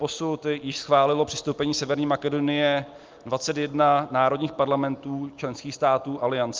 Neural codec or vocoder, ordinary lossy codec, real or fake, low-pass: none; Opus, 24 kbps; real; 7.2 kHz